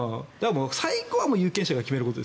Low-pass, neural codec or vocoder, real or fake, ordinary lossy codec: none; none; real; none